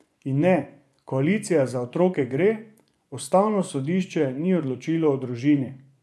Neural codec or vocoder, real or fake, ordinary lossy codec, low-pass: vocoder, 24 kHz, 100 mel bands, Vocos; fake; none; none